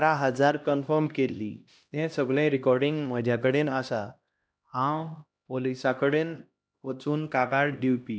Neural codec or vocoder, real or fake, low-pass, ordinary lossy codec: codec, 16 kHz, 1 kbps, X-Codec, HuBERT features, trained on LibriSpeech; fake; none; none